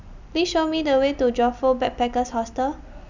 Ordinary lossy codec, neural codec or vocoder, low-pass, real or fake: none; none; 7.2 kHz; real